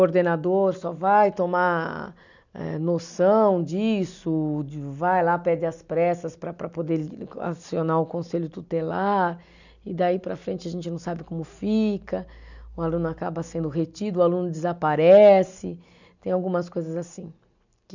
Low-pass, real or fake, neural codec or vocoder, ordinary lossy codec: 7.2 kHz; real; none; none